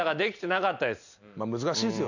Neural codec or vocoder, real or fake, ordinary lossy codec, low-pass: none; real; none; 7.2 kHz